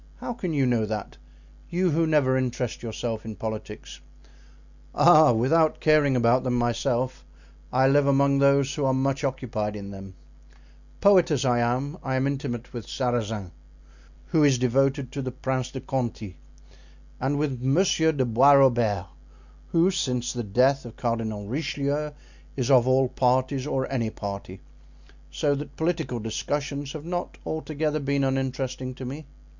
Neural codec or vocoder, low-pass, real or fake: none; 7.2 kHz; real